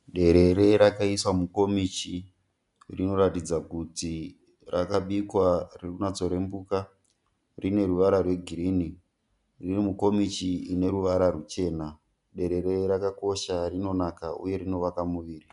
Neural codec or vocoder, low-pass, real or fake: none; 10.8 kHz; real